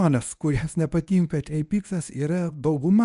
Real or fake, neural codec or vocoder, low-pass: fake; codec, 24 kHz, 0.9 kbps, WavTokenizer, medium speech release version 1; 10.8 kHz